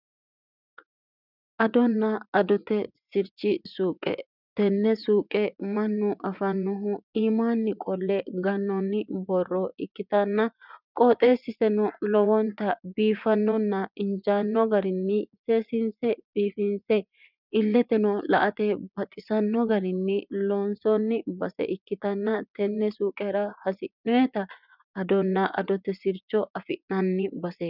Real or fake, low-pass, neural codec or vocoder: fake; 5.4 kHz; vocoder, 24 kHz, 100 mel bands, Vocos